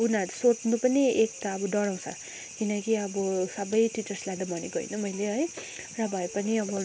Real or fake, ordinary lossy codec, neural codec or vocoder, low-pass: real; none; none; none